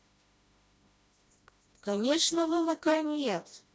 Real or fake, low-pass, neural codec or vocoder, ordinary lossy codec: fake; none; codec, 16 kHz, 1 kbps, FreqCodec, smaller model; none